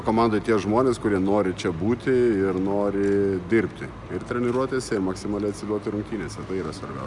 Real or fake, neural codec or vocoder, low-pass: real; none; 10.8 kHz